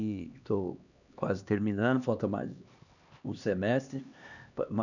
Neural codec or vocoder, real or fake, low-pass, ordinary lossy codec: codec, 16 kHz, 2 kbps, X-Codec, HuBERT features, trained on LibriSpeech; fake; 7.2 kHz; none